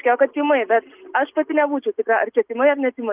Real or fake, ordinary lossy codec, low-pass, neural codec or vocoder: real; Opus, 24 kbps; 3.6 kHz; none